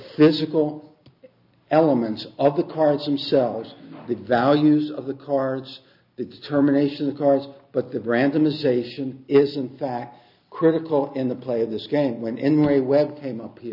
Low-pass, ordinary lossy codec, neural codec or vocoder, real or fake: 5.4 kHz; AAC, 48 kbps; none; real